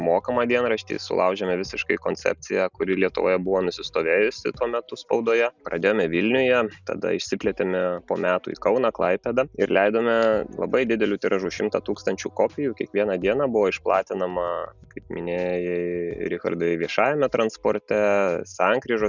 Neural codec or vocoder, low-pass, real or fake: none; 7.2 kHz; real